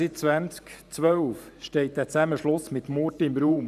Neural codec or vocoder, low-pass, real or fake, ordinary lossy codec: vocoder, 44.1 kHz, 128 mel bands every 512 samples, BigVGAN v2; 14.4 kHz; fake; none